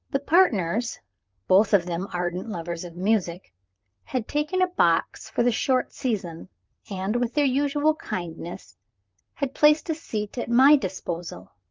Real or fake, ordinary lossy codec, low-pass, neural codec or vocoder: fake; Opus, 24 kbps; 7.2 kHz; vocoder, 44.1 kHz, 128 mel bands, Pupu-Vocoder